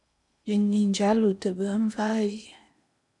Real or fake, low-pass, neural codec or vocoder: fake; 10.8 kHz; codec, 16 kHz in and 24 kHz out, 0.8 kbps, FocalCodec, streaming, 65536 codes